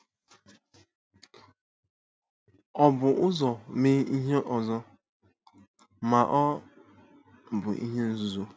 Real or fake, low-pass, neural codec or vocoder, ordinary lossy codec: real; none; none; none